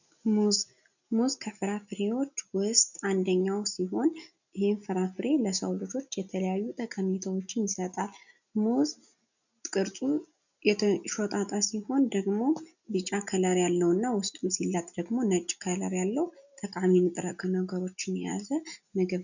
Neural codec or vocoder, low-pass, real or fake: none; 7.2 kHz; real